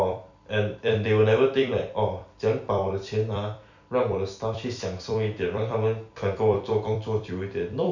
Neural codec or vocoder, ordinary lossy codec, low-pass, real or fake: vocoder, 44.1 kHz, 128 mel bands every 256 samples, BigVGAN v2; none; 7.2 kHz; fake